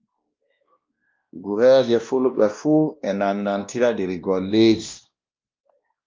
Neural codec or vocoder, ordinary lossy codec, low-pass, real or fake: codec, 16 kHz, 1 kbps, X-Codec, WavLM features, trained on Multilingual LibriSpeech; Opus, 32 kbps; 7.2 kHz; fake